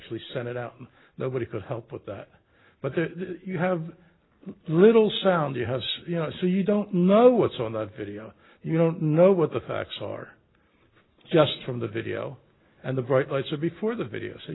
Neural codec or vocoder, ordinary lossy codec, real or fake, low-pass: none; AAC, 16 kbps; real; 7.2 kHz